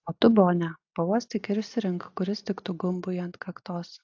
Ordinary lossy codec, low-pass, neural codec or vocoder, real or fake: AAC, 48 kbps; 7.2 kHz; none; real